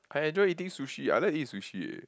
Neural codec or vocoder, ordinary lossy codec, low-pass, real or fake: none; none; none; real